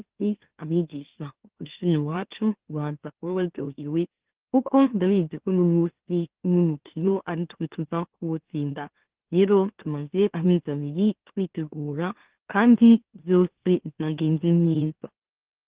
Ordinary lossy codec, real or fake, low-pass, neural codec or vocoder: Opus, 16 kbps; fake; 3.6 kHz; autoencoder, 44.1 kHz, a latent of 192 numbers a frame, MeloTTS